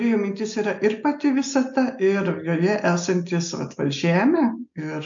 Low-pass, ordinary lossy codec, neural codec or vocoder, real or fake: 7.2 kHz; MP3, 64 kbps; none; real